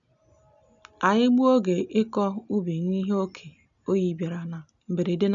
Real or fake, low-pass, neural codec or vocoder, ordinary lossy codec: real; 7.2 kHz; none; none